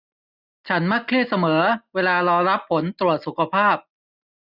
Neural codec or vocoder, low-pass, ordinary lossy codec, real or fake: none; 5.4 kHz; none; real